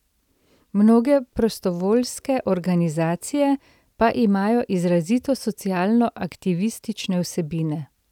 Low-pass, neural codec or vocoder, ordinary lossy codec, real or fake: 19.8 kHz; none; none; real